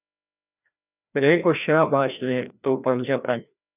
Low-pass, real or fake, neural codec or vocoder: 3.6 kHz; fake; codec, 16 kHz, 1 kbps, FreqCodec, larger model